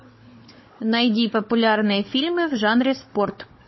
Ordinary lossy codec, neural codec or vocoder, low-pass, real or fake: MP3, 24 kbps; codec, 16 kHz, 16 kbps, FunCodec, trained on Chinese and English, 50 frames a second; 7.2 kHz; fake